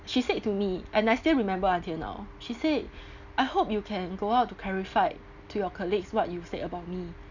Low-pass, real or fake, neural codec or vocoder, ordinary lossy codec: 7.2 kHz; real; none; none